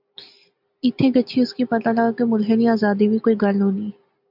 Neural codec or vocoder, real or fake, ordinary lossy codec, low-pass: none; real; MP3, 48 kbps; 5.4 kHz